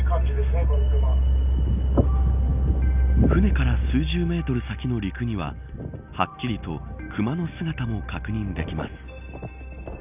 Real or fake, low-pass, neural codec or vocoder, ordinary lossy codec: real; 3.6 kHz; none; none